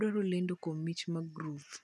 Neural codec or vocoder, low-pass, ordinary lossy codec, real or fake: none; none; none; real